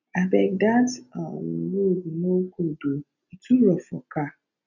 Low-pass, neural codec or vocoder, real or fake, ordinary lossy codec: 7.2 kHz; none; real; none